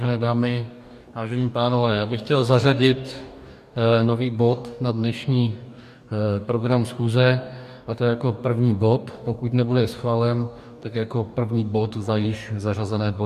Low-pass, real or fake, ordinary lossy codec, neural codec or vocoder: 14.4 kHz; fake; MP3, 96 kbps; codec, 44.1 kHz, 2.6 kbps, DAC